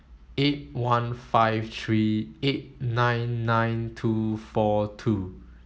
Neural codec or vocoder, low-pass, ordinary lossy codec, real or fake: none; none; none; real